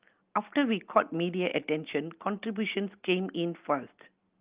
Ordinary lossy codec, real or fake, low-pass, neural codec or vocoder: Opus, 32 kbps; real; 3.6 kHz; none